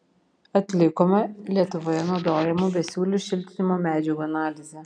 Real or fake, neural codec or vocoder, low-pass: real; none; 9.9 kHz